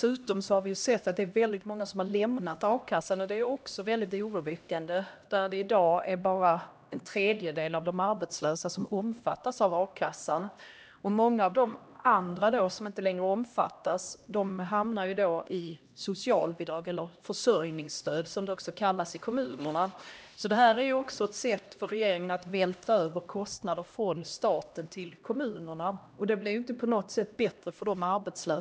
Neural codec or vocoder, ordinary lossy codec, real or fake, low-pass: codec, 16 kHz, 1 kbps, X-Codec, HuBERT features, trained on LibriSpeech; none; fake; none